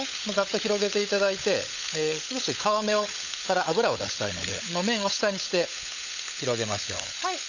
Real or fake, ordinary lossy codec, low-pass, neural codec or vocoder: fake; none; 7.2 kHz; codec, 16 kHz, 16 kbps, FunCodec, trained on LibriTTS, 50 frames a second